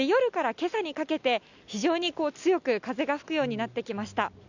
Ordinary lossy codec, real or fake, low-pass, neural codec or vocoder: none; real; 7.2 kHz; none